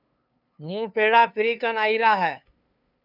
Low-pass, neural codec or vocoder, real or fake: 5.4 kHz; codec, 16 kHz, 2 kbps, FunCodec, trained on LibriTTS, 25 frames a second; fake